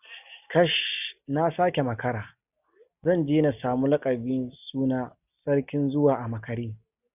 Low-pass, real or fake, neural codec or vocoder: 3.6 kHz; real; none